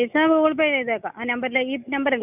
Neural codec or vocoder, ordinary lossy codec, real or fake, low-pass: none; none; real; 3.6 kHz